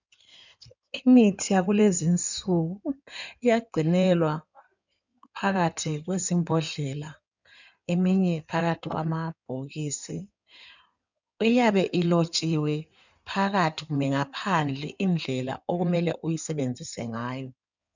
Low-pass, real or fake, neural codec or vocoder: 7.2 kHz; fake; codec, 16 kHz in and 24 kHz out, 2.2 kbps, FireRedTTS-2 codec